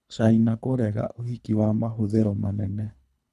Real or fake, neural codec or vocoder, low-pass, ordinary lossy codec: fake; codec, 24 kHz, 3 kbps, HILCodec; none; none